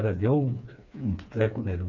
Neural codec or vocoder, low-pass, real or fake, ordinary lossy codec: codec, 16 kHz, 4 kbps, FreqCodec, smaller model; 7.2 kHz; fake; none